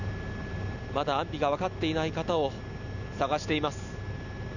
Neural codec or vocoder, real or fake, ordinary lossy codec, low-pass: none; real; none; 7.2 kHz